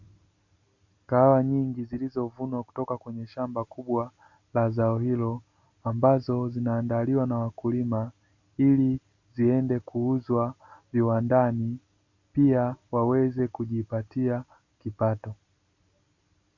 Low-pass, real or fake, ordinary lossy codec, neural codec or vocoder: 7.2 kHz; real; MP3, 48 kbps; none